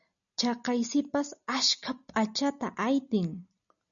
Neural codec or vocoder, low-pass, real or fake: none; 7.2 kHz; real